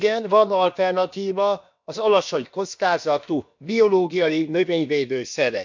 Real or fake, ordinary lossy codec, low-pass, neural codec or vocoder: fake; MP3, 64 kbps; 7.2 kHz; codec, 16 kHz, about 1 kbps, DyCAST, with the encoder's durations